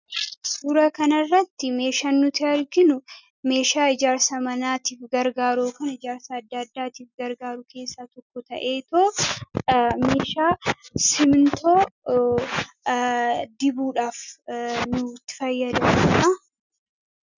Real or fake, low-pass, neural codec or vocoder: real; 7.2 kHz; none